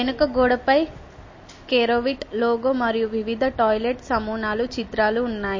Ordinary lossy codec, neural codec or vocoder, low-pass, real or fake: MP3, 32 kbps; none; 7.2 kHz; real